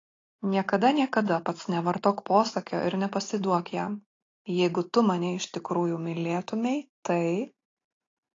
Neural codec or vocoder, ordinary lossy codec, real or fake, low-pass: none; AAC, 32 kbps; real; 7.2 kHz